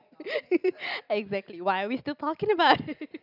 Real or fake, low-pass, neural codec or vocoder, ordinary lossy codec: real; 5.4 kHz; none; none